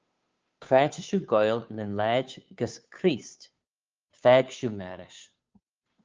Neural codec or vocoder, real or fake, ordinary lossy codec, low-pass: codec, 16 kHz, 2 kbps, FunCodec, trained on Chinese and English, 25 frames a second; fake; Opus, 24 kbps; 7.2 kHz